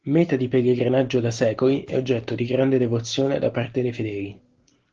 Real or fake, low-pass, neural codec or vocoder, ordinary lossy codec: real; 7.2 kHz; none; Opus, 16 kbps